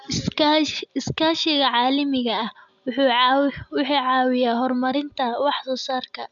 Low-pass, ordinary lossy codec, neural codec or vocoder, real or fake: 7.2 kHz; none; none; real